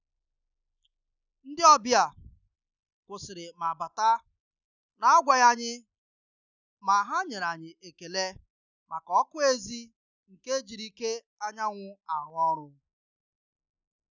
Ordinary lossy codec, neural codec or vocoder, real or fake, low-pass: none; none; real; 7.2 kHz